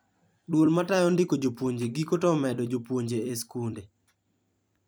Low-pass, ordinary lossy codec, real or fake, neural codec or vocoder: none; none; fake; vocoder, 44.1 kHz, 128 mel bands every 256 samples, BigVGAN v2